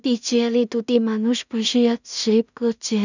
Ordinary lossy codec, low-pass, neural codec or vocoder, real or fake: none; 7.2 kHz; codec, 16 kHz in and 24 kHz out, 0.4 kbps, LongCat-Audio-Codec, two codebook decoder; fake